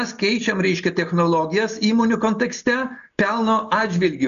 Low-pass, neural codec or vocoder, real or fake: 7.2 kHz; none; real